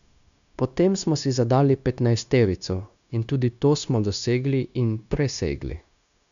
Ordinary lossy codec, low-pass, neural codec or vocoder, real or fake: MP3, 96 kbps; 7.2 kHz; codec, 16 kHz, 0.9 kbps, LongCat-Audio-Codec; fake